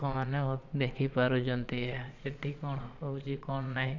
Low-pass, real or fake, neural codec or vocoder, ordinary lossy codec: 7.2 kHz; fake; vocoder, 22.05 kHz, 80 mel bands, Vocos; MP3, 64 kbps